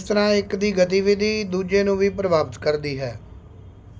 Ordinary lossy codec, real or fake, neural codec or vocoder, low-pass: none; real; none; none